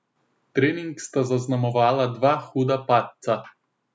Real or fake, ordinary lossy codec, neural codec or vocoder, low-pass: real; none; none; none